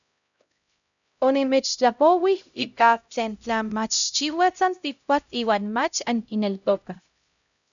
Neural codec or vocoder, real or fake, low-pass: codec, 16 kHz, 0.5 kbps, X-Codec, HuBERT features, trained on LibriSpeech; fake; 7.2 kHz